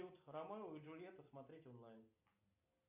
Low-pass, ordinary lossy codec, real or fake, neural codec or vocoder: 3.6 kHz; MP3, 32 kbps; real; none